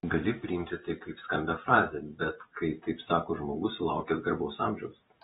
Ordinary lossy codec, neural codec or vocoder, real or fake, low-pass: AAC, 16 kbps; vocoder, 44.1 kHz, 128 mel bands every 256 samples, BigVGAN v2; fake; 19.8 kHz